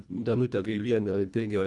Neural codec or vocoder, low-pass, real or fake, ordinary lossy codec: codec, 24 kHz, 1.5 kbps, HILCodec; 10.8 kHz; fake; MP3, 96 kbps